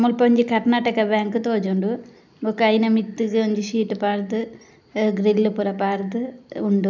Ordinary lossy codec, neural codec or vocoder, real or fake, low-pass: none; none; real; 7.2 kHz